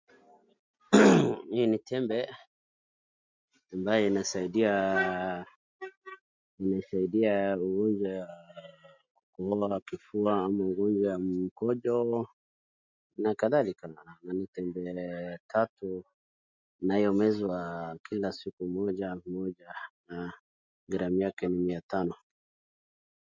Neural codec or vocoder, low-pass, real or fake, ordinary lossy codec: none; 7.2 kHz; real; MP3, 64 kbps